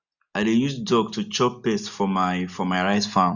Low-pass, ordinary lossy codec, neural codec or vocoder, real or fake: 7.2 kHz; none; none; real